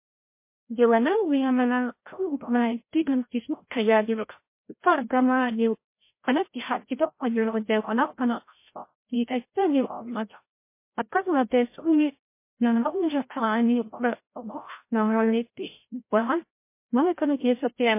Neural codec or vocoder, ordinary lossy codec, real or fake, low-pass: codec, 16 kHz, 0.5 kbps, FreqCodec, larger model; MP3, 24 kbps; fake; 3.6 kHz